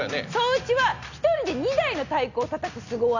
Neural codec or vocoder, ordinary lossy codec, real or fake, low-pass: none; none; real; 7.2 kHz